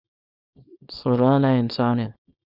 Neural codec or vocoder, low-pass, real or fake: codec, 24 kHz, 0.9 kbps, WavTokenizer, small release; 5.4 kHz; fake